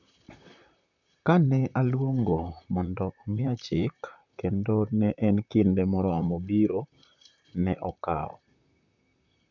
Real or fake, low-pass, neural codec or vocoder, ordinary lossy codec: fake; 7.2 kHz; vocoder, 44.1 kHz, 128 mel bands, Pupu-Vocoder; none